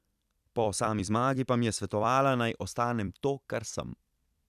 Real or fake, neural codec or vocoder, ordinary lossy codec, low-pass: fake; vocoder, 44.1 kHz, 128 mel bands every 256 samples, BigVGAN v2; none; 14.4 kHz